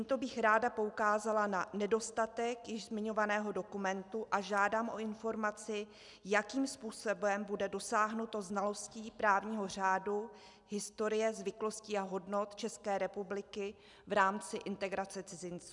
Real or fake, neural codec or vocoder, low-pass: real; none; 10.8 kHz